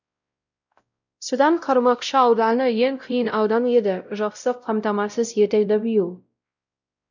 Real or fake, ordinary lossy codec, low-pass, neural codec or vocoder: fake; none; 7.2 kHz; codec, 16 kHz, 0.5 kbps, X-Codec, WavLM features, trained on Multilingual LibriSpeech